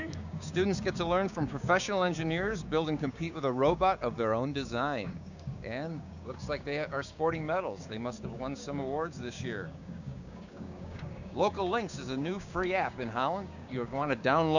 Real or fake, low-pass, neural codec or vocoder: fake; 7.2 kHz; codec, 16 kHz, 6 kbps, DAC